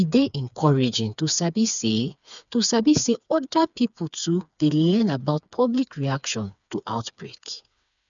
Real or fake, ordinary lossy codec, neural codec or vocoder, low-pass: fake; none; codec, 16 kHz, 4 kbps, FreqCodec, smaller model; 7.2 kHz